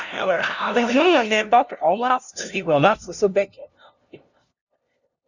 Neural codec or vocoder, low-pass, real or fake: codec, 16 kHz, 0.5 kbps, FunCodec, trained on LibriTTS, 25 frames a second; 7.2 kHz; fake